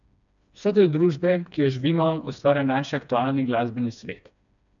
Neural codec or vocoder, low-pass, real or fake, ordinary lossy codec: codec, 16 kHz, 2 kbps, FreqCodec, smaller model; 7.2 kHz; fake; none